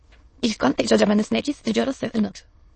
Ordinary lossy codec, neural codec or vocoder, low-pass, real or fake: MP3, 32 kbps; autoencoder, 22.05 kHz, a latent of 192 numbers a frame, VITS, trained on many speakers; 9.9 kHz; fake